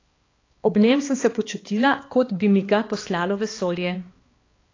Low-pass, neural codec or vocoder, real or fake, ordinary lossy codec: 7.2 kHz; codec, 16 kHz, 2 kbps, X-Codec, HuBERT features, trained on balanced general audio; fake; AAC, 32 kbps